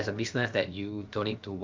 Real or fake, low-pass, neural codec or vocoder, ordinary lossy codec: fake; 7.2 kHz; codec, 16 kHz, about 1 kbps, DyCAST, with the encoder's durations; Opus, 24 kbps